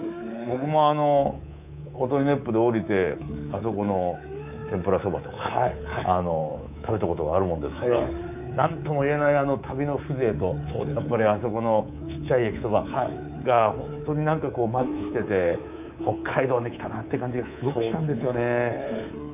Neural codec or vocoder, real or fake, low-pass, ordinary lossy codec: codec, 24 kHz, 3.1 kbps, DualCodec; fake; 3.6 kHz; none